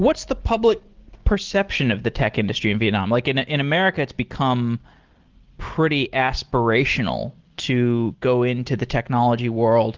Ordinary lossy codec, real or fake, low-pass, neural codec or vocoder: Opus, 16 kbps; real; 7.2 kHz; none